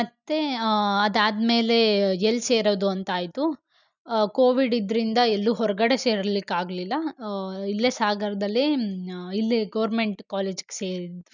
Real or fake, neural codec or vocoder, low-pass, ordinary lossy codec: real; none; 7.2 kHz; none